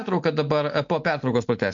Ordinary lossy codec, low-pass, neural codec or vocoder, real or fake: MP3, 48 kbps; 7.2 kHz; none; real